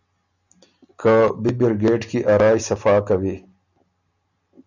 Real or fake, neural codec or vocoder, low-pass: real; none; 7.2 kHz